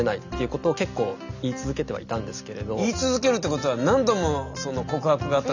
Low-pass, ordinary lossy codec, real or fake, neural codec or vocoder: 7.2 kHz; none; real; none